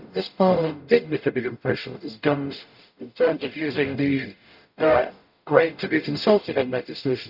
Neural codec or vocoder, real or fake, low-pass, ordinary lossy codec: codec, 44.1 kHz, 0.9 kbps, DAC; fake; 5.4 kHz; none